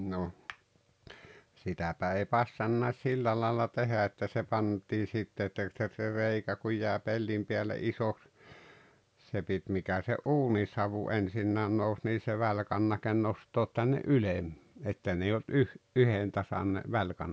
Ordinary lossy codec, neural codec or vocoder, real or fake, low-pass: none; none; real; none